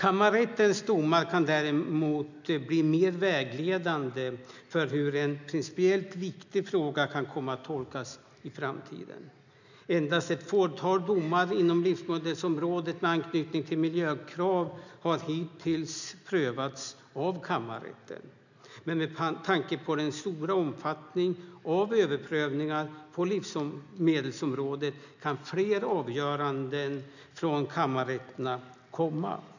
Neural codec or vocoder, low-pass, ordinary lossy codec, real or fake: none; 7.2 kHz; none; real